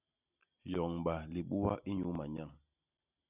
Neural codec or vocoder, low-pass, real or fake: none; 3.6 kHz; real